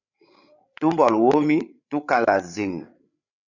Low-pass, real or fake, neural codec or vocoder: 7.2 kHz; fake; codec, 16 kHz, 8 kbps, FreqCodec, larger model